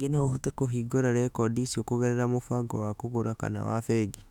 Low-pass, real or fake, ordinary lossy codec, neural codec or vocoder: 19.8 kHz; fake; none; autoencoder, 48 kHz, 32 numbers a frame, DAC-VAE, trained on Japanese speech